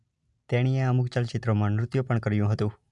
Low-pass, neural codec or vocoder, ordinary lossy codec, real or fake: 10.8 kHz; none; MP3, 96 kbps; real